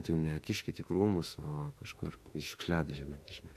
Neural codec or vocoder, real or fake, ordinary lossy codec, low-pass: autoencoder, 48 kHz, 32 numbers a frame, DAC-VAE, trained on Japanese speech; fake; AAC, 64 kbps; 14.4 kHz